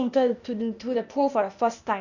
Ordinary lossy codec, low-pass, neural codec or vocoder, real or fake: none; 7.2 kHz; codec, 16 kHz, 0.8 kbps, ZipCodec; fake